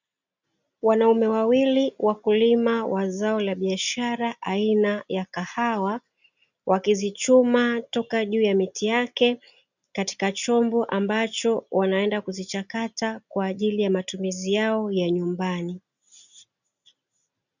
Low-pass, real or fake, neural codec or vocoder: 7.2 kHz; real; none